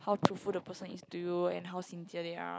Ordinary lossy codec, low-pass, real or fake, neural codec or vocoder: none; none; real; none